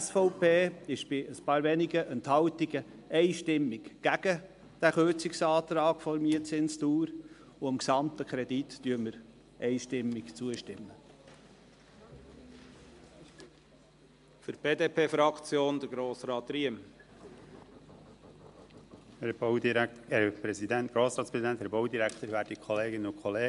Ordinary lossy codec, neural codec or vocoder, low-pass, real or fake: MP3, 64 kbps; none; 10.8 kHz; real